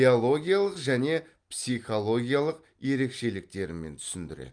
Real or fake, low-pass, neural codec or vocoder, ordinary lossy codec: real; 9.9 kHz; none; Opus, 32 kbps